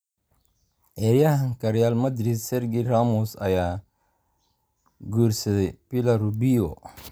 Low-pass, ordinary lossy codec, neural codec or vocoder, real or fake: none; none; none; real